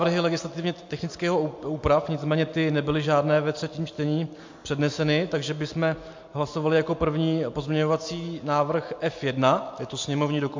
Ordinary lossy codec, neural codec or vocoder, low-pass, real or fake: MP3, 48 kbps; none; 7.2 kHz; real